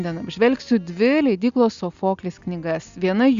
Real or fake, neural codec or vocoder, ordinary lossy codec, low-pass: real; none; Opus, 64 kbps; 7.2 kHz